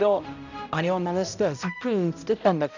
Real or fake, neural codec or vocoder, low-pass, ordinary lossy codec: fake; codec, 16 kHz, 0.5 kbps, X-Codec, HuBERT features, trained on balanced general audio; 7.2 kHz; none